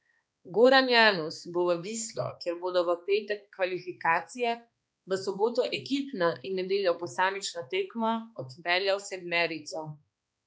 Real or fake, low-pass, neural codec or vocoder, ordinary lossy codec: fake; none; codec, 16 kHz, 2 kbps, X-Codec, HuBERT features, trained on balanced general audio; none